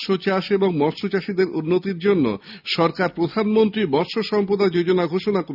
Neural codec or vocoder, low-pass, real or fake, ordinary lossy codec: none; 5.4 kHz; real; none